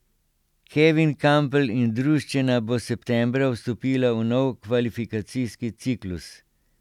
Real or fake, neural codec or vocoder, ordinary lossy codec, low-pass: real; none; none; 19.8 kHz